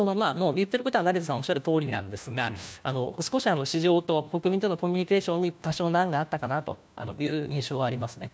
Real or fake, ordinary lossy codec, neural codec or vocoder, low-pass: fake; none; codec, 16 kHz, 1 kbps, FunCodec, trained on LibriTTS, 50 frames a second; none